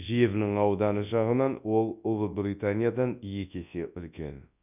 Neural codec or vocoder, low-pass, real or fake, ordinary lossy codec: codec, 24 kHz, 0.9 kbps, WavTokenizer, large speech release; 3.6 kHz; fake; none